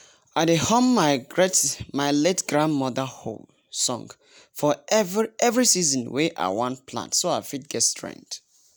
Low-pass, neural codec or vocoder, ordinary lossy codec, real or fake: none; none; none; real